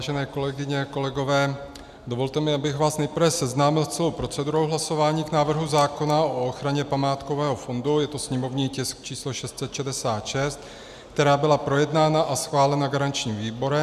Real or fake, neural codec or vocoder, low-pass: real; none; 14.4 kHz